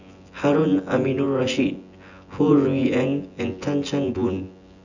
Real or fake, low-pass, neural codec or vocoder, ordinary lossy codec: fake; 7.2 kHz; vocoder, 24 kHz, 100 mel bands, Vocos; none